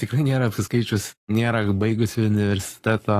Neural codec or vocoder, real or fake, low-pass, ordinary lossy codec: codec, 44.1 kHz, 7.8 kbps, Pupu-Codec; fake; 14.4 kHz; AAC, 48 kbps